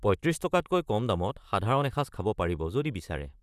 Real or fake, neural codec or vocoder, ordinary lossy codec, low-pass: fake; vocoder, 44.1 kHz, 128 mel bands, Pupu-Vocoder; none; 14.4 kHz